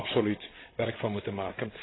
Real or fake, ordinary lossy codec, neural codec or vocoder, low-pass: fake; AAC, 16 kbps; codec, 16 kHz, 16 kbps, FreqCodec, larger model; 7.2 kHz